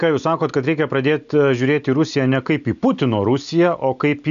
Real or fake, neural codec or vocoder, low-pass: real; none; 7.2 kHz